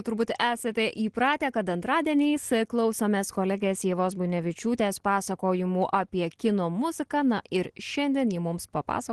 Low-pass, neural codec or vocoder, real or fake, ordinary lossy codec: 10.8 kHz; none; real; Opus, 16 kbps